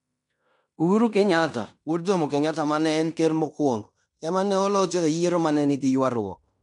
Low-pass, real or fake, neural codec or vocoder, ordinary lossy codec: 10.8 kHz; fake; codec, 16 kHz in and 24 kHz out, 0.9 kbps, LongCat-Audio-Codec, fine tuned four codebook decoder; none